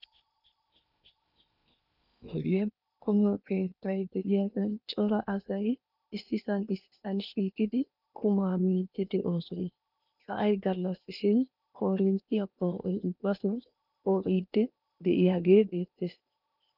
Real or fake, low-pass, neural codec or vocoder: fake; 5.4 kHz; codec, 16 kHz in and 24 kHz out, 0.8 kbps, FocalCodec, streaming, 65536 codes